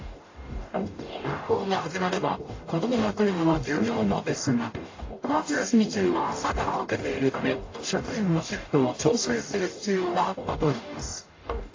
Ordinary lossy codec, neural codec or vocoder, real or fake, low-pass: AAC, 32 kbps; codec, 44.1 kHz, 0.9 kbps, DAC; fake; 7.2 kHz